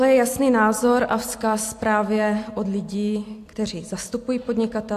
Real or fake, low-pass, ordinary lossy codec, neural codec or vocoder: real; 14.4 kHz; AAC, 64 kbps; none